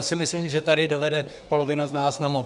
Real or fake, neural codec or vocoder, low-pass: fake; codec, 24 kHz, 1 kbps, SNAC; 10.8 kHz